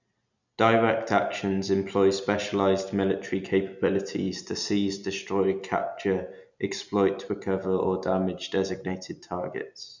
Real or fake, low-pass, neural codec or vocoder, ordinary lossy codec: real; 7.2 kHz; none; none